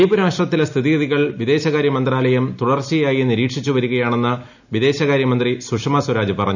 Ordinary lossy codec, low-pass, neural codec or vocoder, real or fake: none; 7.2 kHz; none; real